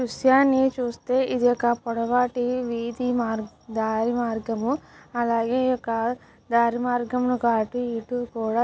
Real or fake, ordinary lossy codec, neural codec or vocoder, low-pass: real; none; none; none